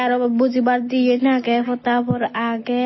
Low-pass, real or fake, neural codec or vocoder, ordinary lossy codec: 7.2 kHz; real; none; MP3, 24 kbps